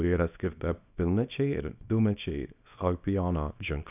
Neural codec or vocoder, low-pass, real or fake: codec, 24 kHz, 0.9 kbps, WavTokenizer, small release; 3.6 kHz; fake